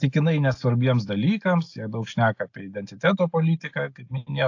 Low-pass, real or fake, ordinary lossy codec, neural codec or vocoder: 7.2 kHz; real; AAC, 48 kbps; none